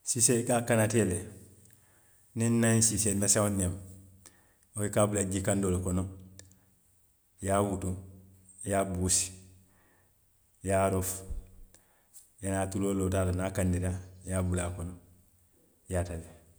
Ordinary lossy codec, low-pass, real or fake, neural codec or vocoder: none; none; real; none